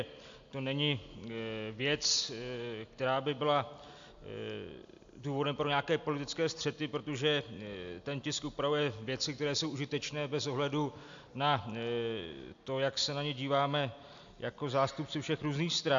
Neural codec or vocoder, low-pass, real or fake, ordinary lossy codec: none; 7.2 kHz; real; AAC, 64 kbps